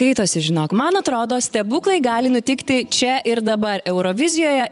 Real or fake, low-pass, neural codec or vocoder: fake; 10.8 kHz; vocoder, 24 kHz, 100 mel bands, Vocos